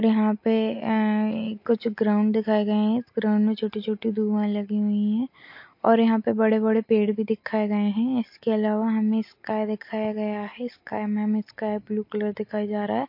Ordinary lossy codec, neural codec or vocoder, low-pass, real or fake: MP3, 32 kbps; none; 5.4 kHz; real